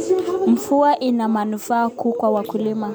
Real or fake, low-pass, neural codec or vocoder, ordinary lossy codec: real; none; none; none